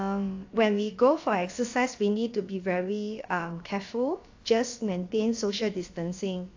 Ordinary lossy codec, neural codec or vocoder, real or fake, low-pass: AAC, 48 kbps; codec, 16 kHz, about 1 kbps, DyCAST, with the encoder's durations; fake; 7.2 kHz